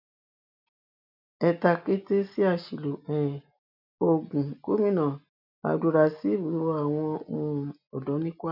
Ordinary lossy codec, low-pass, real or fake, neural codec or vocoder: none; 5.4 kHz; real; none